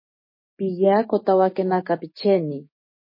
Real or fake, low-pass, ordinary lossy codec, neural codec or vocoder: fake; 5.4 kHz; MP3, 24 kbps; codec, 16 kHz, 6 kbps, DAC